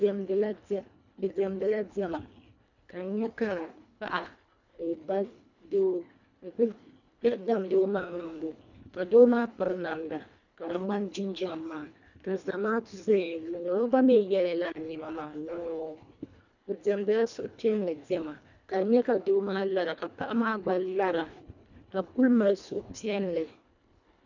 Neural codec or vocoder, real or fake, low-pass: codec, 24 kHz, 1.5 kbps, HILCodec; fake; 7.2 kHz